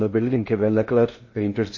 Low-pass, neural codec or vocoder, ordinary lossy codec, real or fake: 7.2 kHz; codec, 16 kHz in and 24 kHz out, 0.6 kbps, FocalCodec, streaming, 2048 codes; MP3, 32 kbps; fake